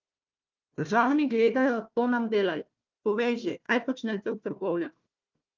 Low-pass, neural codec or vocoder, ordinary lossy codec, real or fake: 7.2 kHz; codec, 16 kHz, 1 kbps, FunCodec, trained on Chinese and English, 50 frames a second; Opus, 32 kbps; fake